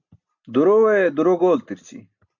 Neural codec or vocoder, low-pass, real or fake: none; 7.2 kHz; real